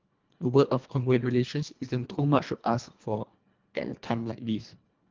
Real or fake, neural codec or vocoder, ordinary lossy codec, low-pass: fake; codec, 24 kHz, 1.5 kbps, HILCodec; Opus, 24 kbps; 7.2 kHz